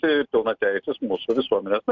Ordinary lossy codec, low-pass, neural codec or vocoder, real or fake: MP3, 64 kbps; 7.2 kHz; none; real